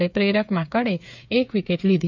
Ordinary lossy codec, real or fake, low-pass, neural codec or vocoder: none; fake; 7.2 kHz; codec, 16 kHz, 8 kbps, FreqCodec, smaller model